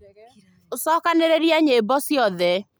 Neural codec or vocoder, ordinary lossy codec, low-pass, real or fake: none; none; none; real